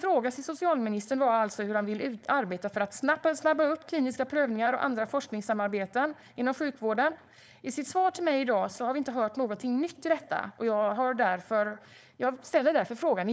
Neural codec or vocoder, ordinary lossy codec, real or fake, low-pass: codec, 16 kHz, 4.8 kbps, FACodec; none; fake; none